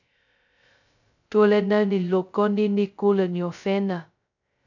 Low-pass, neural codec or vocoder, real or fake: 7.2 kHz; codec, 16 kHz, 0.2 kbps, FocalCodec; fake